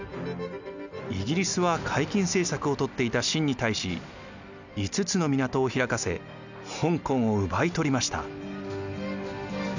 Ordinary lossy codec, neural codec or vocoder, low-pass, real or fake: none; none; 7.2 kHz; real